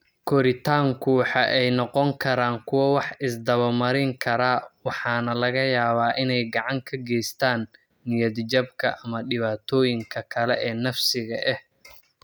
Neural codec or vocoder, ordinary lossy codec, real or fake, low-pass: none; none; real; none